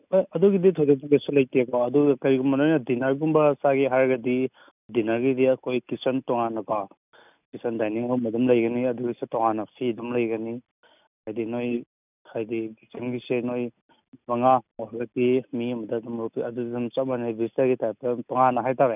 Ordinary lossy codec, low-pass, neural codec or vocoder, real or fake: none; 3.6 kHz; none; real